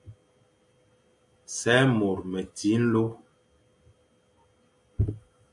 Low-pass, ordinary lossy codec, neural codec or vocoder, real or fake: 10.8 kHz; AAC, 64 kbps; none; real